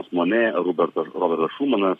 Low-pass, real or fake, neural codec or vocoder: 14.4 kHz; fake; codec, 44.1 kHz, 7.8 kbps, Pupu-Codec